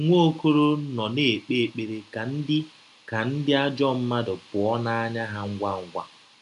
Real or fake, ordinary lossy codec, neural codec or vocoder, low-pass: real; AAC, 96 kbps; none; 10.8 kHz